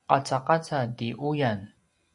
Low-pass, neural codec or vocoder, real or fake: 10.8 kHz; none; real